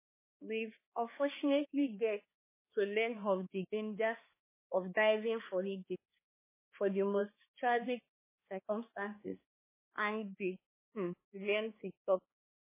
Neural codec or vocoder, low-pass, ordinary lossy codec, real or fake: codec, 16 kHz, 2 kbps, X-Codec, HuBERT features, trained on balanced general audio; 3.6 kHz; MP3, 16 kbps; fake